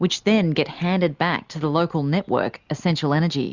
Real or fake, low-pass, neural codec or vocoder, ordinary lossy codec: real; 7.2 kHz; none; Opus, 64 kbps